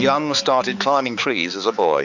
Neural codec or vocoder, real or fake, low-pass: codec, 16 kHz, 4 kbps, X-Codec, HuBERT features, trained on balanced general audio; fake; 7.2 kHz